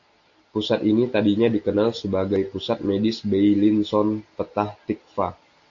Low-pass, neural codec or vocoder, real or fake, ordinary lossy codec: 7.2 kHz; none; real; AAC, 48 kbps